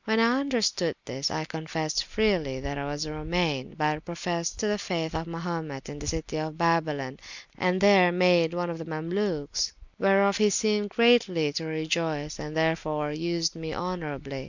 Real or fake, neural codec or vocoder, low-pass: real; none; 7.2 kHz